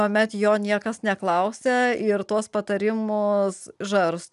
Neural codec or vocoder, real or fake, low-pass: none; real; 10.8 kHz